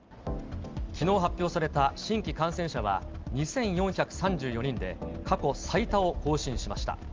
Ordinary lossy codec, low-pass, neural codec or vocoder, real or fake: Opus, 32 kbps; 7.2 kHz; none; real